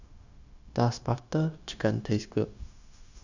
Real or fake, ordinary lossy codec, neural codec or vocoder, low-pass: fake; none; codec, 16 kHz, 0.9 kbps, LongCat-Audio-Codec; 7.2 kHz